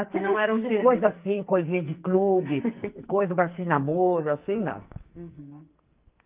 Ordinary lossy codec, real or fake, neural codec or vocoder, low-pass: Opus, 24 kbps; fake; codec, 44.1 kHz, 2.6 kbps, SNAC; 3.6 kHz